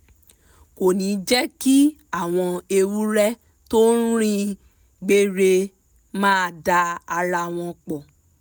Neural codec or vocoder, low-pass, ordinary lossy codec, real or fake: none; none; none; real